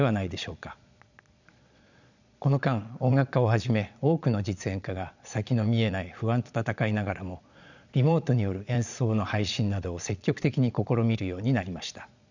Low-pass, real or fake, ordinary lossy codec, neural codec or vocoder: 7.2 kHz; fake; none; vocoder, 22.05 kHz, 80 mel bands, Vocos